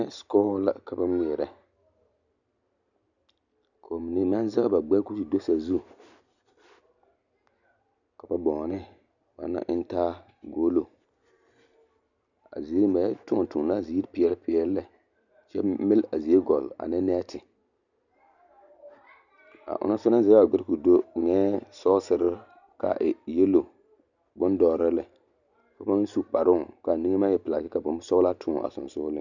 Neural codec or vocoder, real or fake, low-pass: none; real; 7.2 kHz